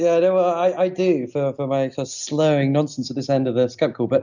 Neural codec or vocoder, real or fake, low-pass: none; real; 7.2 kHz